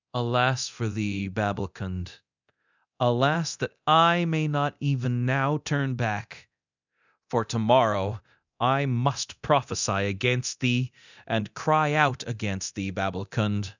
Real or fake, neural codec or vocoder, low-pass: fake; codec, 24 kHz, 0.9 kbps, DualCodec; 7.2 kHz